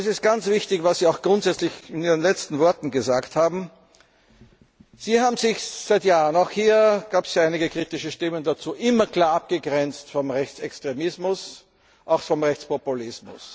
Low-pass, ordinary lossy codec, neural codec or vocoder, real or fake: none; none; none; real